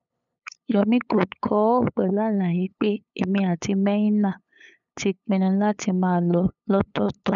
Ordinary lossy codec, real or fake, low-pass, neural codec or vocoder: none; fake; 7.2 kHz; codec, 16 kHz, 8 kbps, FunCodec, trained on LibriTTS, 25 frames a second